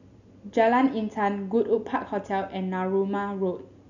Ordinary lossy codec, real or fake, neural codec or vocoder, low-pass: none; real; none; 7.2 kHz